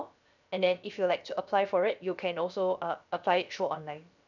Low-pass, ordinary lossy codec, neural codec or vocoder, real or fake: 7.2 kHz; none; codec, 16 kHz, 0.7 kbps, FocalCodec; fake